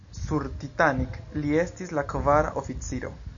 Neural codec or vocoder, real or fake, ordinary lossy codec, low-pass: none; real; MP3, 64 kbps; 7.2 kHz